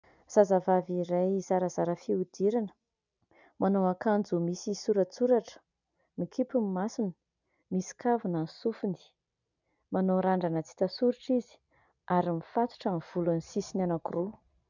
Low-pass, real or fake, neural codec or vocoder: 7.2 kHz; real; none